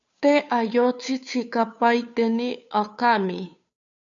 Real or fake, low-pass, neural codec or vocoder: fake; 7.2 kHz; codec, 16 kHz, 2 kbps, FunCodec, trained on Chinese and English, 25 frames a second